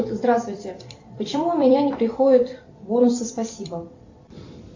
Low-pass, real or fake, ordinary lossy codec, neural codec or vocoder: 7.2 kHz; fake; AAC, 48 kbps; vocoder, 44.1 kHz, 128 mel bands every 256 samples, BigVGAN v2